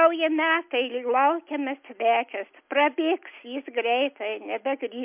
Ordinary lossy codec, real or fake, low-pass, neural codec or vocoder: MP3, 32 kbps; real; 3.6 kHz; none